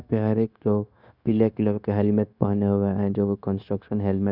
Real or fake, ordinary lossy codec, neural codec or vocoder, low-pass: fake; none; codec, 16 kHz, 0.9 kbps, LongCat-Audio-Codec; 5.4 kHz